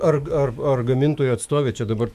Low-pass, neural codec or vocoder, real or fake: 14.4 kHz; autoencoder, 48 kHz, 128 numbers a frame, DAC-VAE, trained on Japanese speech; fake